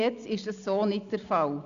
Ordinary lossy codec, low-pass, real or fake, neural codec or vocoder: Opus, 64 kbps; 7.2 kHz; real; none